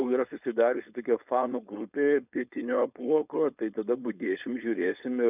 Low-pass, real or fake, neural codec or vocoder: 3.6 kHz; fake; codec, 16 kHz, 4.8 kbps, FACodec